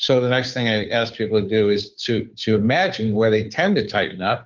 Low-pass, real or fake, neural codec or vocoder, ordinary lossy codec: 7.2 kHz; fake; codec, 16 kHz, 2 kbps, FunCodec, trained on Chinese and English, 25 frames a second; Opus, 24 kbps